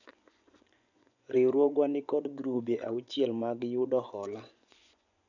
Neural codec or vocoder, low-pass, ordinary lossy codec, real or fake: vocoder, 24 kHz, 100 mel bands, Vocos; 7.2 kHz; none; fake